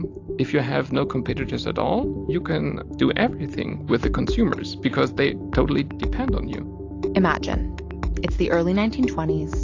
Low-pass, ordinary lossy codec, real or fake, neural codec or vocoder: 7.2 kHz; AAC, 48 kbps; real; none